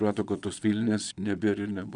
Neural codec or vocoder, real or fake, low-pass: vocoder, 22.05 kHz, 80 mel bands, Vocos; fake; 9.9 kHz